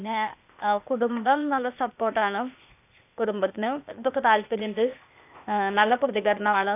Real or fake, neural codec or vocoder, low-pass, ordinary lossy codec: fake; codec, 16 kHz, 0.8 kbps, ZipCodec; 3.6 kHz; none